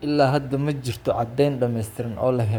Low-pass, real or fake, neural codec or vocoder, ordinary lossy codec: none; fake; codec, 44.1 kHz, 7.8 kbps, Pupu-Codec; none